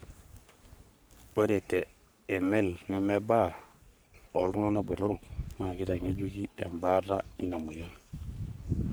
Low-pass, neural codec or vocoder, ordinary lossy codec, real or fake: none; codec, 44.1 kHz, 3.4 kbps, Pupu-Codec; none; fake